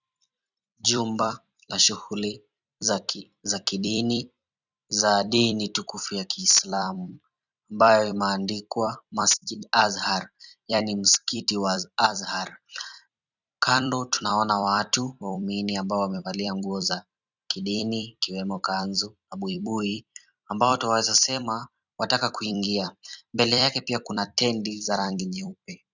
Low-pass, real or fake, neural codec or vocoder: 7.2 kHz; fake; vocoder, 44.1 kHz, 128 mel bands every 256 samples, BigVGAN v2